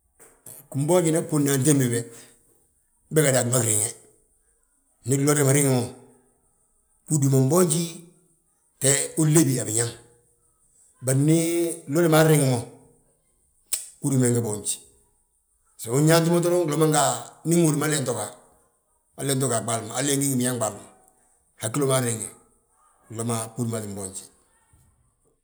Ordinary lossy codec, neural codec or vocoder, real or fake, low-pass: none; none; real; none